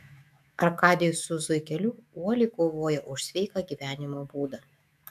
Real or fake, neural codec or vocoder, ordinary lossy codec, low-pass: fake; autoencoder, 48 kHz, 128 numbers a frame, DAC-VAE, trained on Japanese speech; AAC, 96 kbps; 14.4 kHz